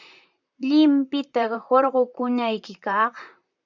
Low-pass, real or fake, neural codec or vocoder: 7.2 kHz; fake; vocoder, 44.1 kHz, 128 mel bands, Pupu-Vocoder